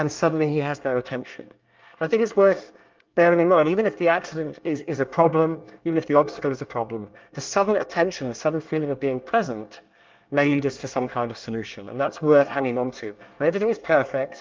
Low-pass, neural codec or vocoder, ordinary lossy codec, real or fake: 7.2 kHz; codec, 24 kHz, 1 kbps, SNAC; Opus, 24 kbps; fake